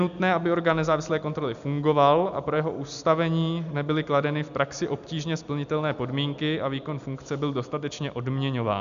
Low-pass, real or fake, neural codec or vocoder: 7.2 kHz; real; none